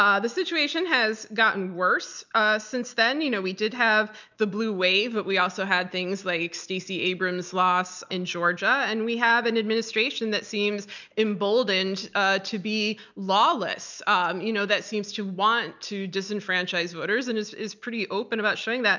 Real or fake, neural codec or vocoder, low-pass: real; none; 7.2 kHz